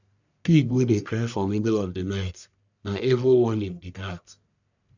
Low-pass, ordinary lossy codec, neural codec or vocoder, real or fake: 7.2 kHz; none; codec, 44.1 kHz, 1.7 kbps, Pupu-Codec; fake